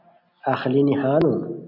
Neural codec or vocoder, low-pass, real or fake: none; 5.4 kHz; real